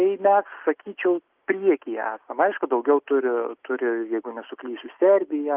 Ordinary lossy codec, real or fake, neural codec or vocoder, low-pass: Opus, 32 kbps; real; none; 3.6 kHz